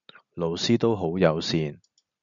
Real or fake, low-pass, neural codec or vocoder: real; 7.2 kHz; none